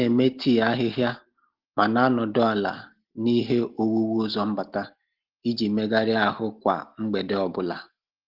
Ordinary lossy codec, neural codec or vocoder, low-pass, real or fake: Opus, 16 kbps; none; 5.4 kHz; real